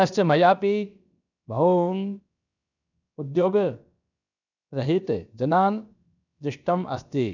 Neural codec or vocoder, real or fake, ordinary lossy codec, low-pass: codec, 16 kHz, about 1 kbps, DyCAST, with the encoder's durations; fake; none; 7.2 kHz